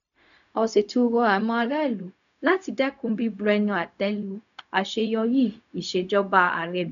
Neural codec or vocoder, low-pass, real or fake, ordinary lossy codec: codec, 16 kHz, 0.4 kbps, LongCat-Audio-Codec; 7.2 kHz; fake; none